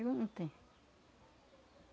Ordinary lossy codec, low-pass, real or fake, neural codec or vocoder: none; none; real; none